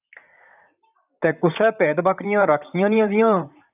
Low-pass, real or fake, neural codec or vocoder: 3.6 kHz; fake; vocoder, 44.1 kHz, 128 mel bands, Pupu-Vocoder